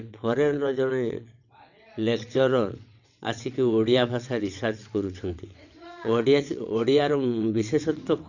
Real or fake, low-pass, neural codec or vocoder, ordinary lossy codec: fake; 7.2 kHz; vocoder, 22.05 kHz, 80 mel bands, WaveNeXt; none